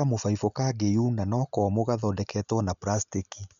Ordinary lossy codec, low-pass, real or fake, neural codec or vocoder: none; 7.2 kHz; real; none